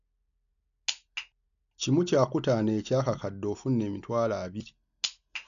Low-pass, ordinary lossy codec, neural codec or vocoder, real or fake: 7.2 kHz; none; none; real